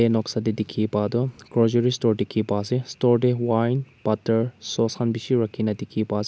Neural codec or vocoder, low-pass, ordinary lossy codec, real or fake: none; none; none; real